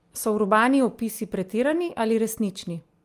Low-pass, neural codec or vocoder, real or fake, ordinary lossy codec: 14.4 kHz; none; real; Opus, 32 kbps